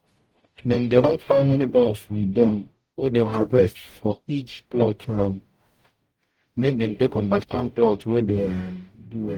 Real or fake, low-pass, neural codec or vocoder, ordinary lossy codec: fake; 19.8 kHz; codec, 44.1 kHz, 0.9 kbps, DAC; Opus, 24 kbps